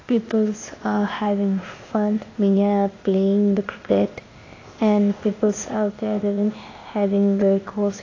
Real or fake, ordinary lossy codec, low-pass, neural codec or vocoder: fake; AAC, 32 kbps; 7.2 kHz; codec, 16 kHz, 0.8 kbps, ZipCodec